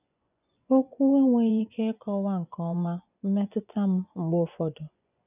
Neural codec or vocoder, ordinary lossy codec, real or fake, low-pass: vocoder, 24 kHz, 100 mel bands, Vocos; none; fake; 3.6 kHz